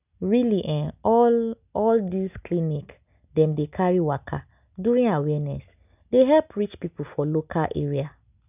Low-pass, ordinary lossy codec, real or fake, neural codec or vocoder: 3.6 kHz; none; real; none